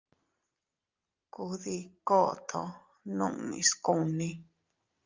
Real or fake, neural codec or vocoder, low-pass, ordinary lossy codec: real; none; 7.2 kHz; Opus, 24 kbps